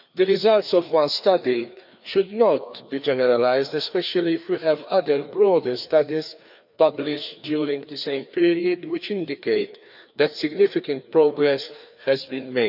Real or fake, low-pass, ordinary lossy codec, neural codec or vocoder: fake; 5.4 kHz; none; codec, 16 kHz, 2 kbps, FreqCodec, larger model